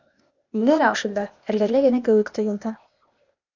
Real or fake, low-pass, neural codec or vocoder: fake; 7.2 kHz; codec, 16 kHz, 0.8 kbps, ZipCodec